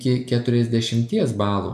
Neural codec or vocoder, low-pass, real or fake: none; 14.4 kHz; real